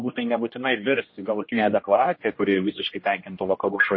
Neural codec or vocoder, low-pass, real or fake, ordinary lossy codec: codec, 16 kHz, 1 kbps, X-Codec, HuBERT features, trained on general audio; 7.2 kHz; fake; MP3, 24 kbps